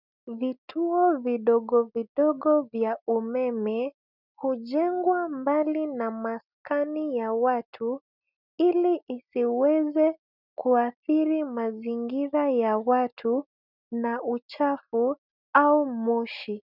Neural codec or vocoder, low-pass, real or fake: none; 5.4 kHz; real